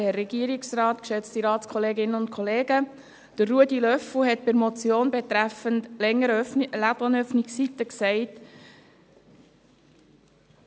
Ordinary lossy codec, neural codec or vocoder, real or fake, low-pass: none; none; real; none